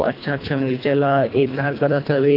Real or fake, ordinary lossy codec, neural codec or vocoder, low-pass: fake; AAC, 32 kbps; codec, 24 kHz, 1.5 kbps, HILCodec; 5.4 kHz